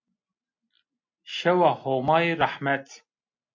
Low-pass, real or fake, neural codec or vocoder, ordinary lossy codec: 7.2 kHz; real; none; MP3, 48 kbps